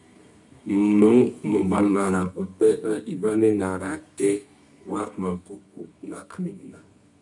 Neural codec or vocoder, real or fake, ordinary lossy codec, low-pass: codec, 24 kHz, 0.9 kbps, WavTokenizer, medium music audio release; fake; MP3, 64 kbps; 10.8 kHz